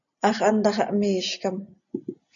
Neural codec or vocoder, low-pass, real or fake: none; 7.2 kHz; real